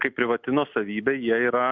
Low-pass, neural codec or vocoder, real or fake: 7.2 kHz; none; real